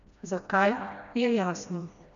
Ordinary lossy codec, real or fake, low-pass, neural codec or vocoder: none; fake; 7.2 kHz; codec, 16 kHz, 1 kbps, FreqCodec, smaller model